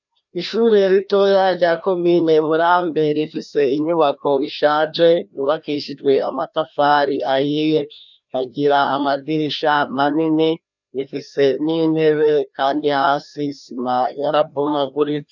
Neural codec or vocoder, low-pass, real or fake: codec, 16 kHz, 1 kbps, FreqCodec, larger model; 7.2 kHz; fake